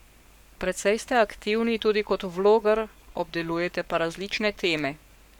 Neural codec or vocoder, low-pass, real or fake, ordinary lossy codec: codec, 44.1 kHz, 7.8 kbps, Pupu-Codec; 19.8 kHz; fake; none